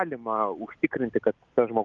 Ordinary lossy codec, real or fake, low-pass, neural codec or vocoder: Opus, 32 kbps; real; 7.2 kHz; none